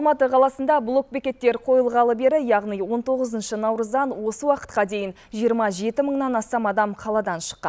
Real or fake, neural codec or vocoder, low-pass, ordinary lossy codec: real; none; none; none